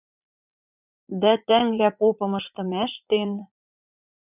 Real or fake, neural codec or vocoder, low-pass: fake; vocoder, 44.1 kHz, 80 mel bands, Vocos; 3.6 kHz